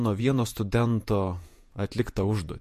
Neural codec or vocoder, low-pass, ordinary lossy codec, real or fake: vocoder, 48 kHz, 128 mel bands, Vocos; 14.4 kHz; MP3, 64 kbps; fake